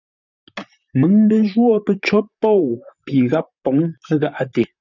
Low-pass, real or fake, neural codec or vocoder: 7.2 kHz; fake; vocoder, 44.1 kHz, 128 mel bands, Pupu-Vocoder